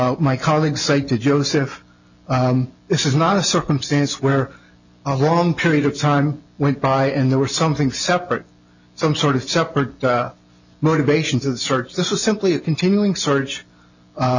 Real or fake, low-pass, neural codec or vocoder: real; 7.2 kHz; none